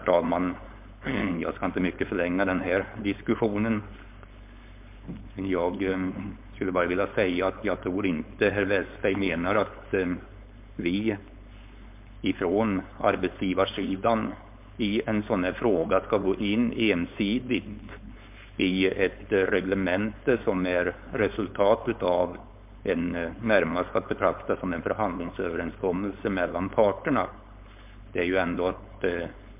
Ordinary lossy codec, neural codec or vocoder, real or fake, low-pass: MP3, 32 kbps; codec, 16 kHz, 4.8 kbps, FACodec; fake; 3.6 kHz